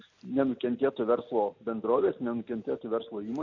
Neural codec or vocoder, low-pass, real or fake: none; 7.2 kHz; real